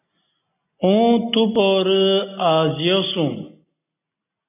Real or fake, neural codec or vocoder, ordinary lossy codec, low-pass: real; none; AAC, 32 kbps; 3.6 kHz